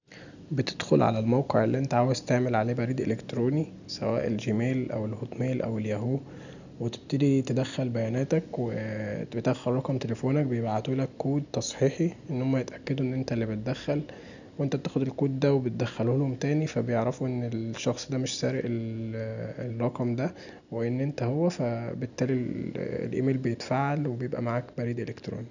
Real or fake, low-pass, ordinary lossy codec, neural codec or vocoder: real; 7.2 kHz; none; none